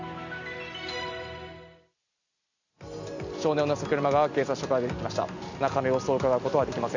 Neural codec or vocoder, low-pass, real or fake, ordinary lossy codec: none; 7.2 kHz; real; none